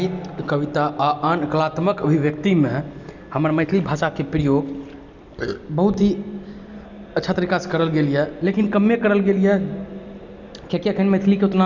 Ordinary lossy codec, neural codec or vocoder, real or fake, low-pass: none; none; real; 7.2 kHz